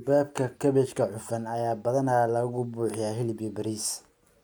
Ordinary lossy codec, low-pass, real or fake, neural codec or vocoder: none; none; real; none